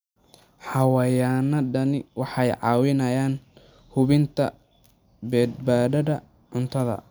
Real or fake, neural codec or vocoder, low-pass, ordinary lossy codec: real; none; none; none